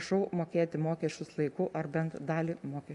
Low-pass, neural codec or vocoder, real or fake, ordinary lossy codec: 10.8 kHz; none; real; AAC, 64 kbps